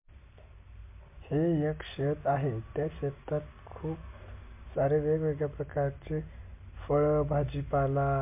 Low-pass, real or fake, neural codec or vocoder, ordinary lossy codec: 3.6 kHz; real; none; MP3, 24 kbps